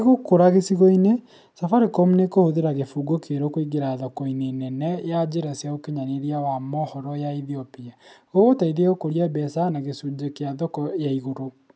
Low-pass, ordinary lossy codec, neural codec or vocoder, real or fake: none; none; none; real